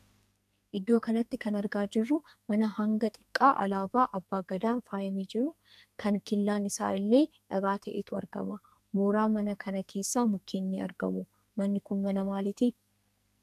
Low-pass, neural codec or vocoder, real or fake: 14.4 kHz; codec, 44.1 kHz, 2.6 kbps, SNAC; fake